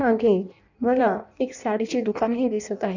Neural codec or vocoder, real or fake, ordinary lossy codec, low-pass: codec, 16 kHz in and 24 kHz out, 0.6 kbps, FireRedTTS-2 codec; fake; none; 7.2 kHz